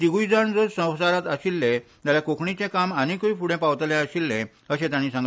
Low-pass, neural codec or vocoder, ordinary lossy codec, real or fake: none; none; none; real